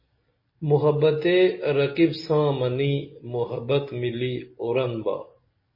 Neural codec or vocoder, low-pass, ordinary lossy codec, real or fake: none; 5.4 kHz; MP3, 24 kbps; real